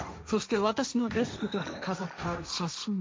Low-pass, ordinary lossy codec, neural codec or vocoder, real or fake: none; none; codec, 16 kHz, 1.1 kbps, Voila-Tokenizer; fake